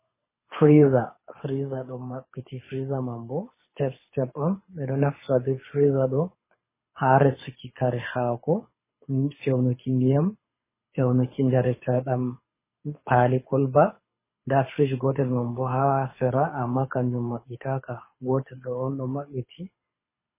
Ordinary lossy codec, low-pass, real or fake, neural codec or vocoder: MP3, 16 kbps; 3.6 kHz; fake; codec, 24 kHz, 6 kbps, HILCodec